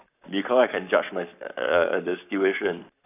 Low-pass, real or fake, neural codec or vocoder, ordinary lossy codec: 3.6 kHz; fake; codec, 44.1 kHz, 7.8 kbps, Pupu-Codec; none